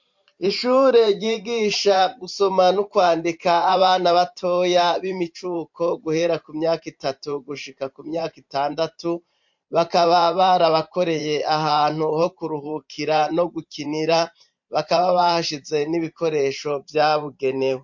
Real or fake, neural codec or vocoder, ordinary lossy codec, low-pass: fake; vocoder, 44.1 kHz, 128 mel bands every 512 samples, BigVGAN v2; MP3, 48 kbps; 7.2 kHz